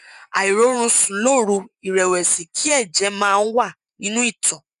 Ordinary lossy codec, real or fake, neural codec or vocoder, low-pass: none; fake; vocoder, 24 kHz, 100 mel bands, Vocos; 10.8 kHz